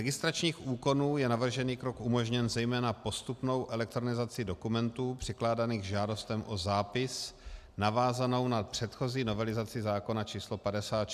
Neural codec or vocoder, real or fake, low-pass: none; real; 14.4 kHz